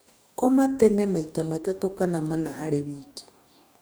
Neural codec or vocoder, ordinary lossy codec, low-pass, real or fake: codec, 44.1 kHz, 2.6 kbps, DAC; none; none; fake